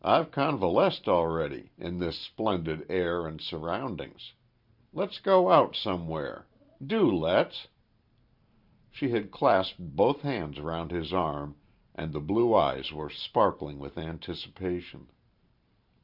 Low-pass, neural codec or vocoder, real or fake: 5.4 kHz; vocoder, 44.1 kHz, 128 mel bands every 512 samples, BigVGAN v2; fake